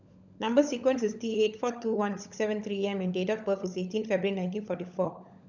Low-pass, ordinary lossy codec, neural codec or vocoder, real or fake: 7.2 kHz; none; codec, 16 kHz, 16 kbps, FunCodec, trained on LibriTTS, 50 frames a second; fake